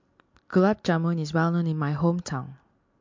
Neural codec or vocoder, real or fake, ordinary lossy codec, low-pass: none; real; MP3, 48 kbps; 7.2 kHz